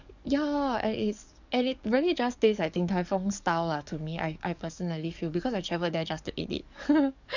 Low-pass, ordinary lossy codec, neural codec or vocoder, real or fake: 7.2 kHz; none; codec, 44.1 kHz, 7.8 kbps, DAC; fake